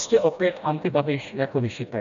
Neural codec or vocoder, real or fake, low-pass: codec, 16 kHz, 1 kbps, FreqCodec, smaller model; fake; 7.2 kHz